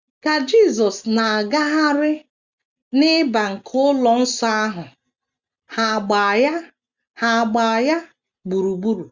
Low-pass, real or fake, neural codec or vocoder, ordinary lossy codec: 7.2 kHz; real; none; Opus, 64 kbps